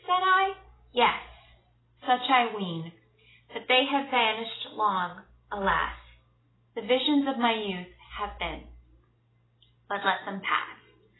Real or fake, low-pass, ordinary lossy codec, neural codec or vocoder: real; 7.2 kHz; AAC, 16 kbps; none